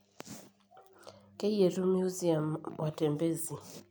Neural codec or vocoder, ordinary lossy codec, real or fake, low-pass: none; none; real; none